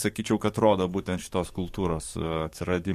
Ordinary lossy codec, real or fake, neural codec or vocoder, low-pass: MP3, 64 kbps; fake; codec, 44.1 kHz, 7.8 kbps, DAC; 14.4 kHz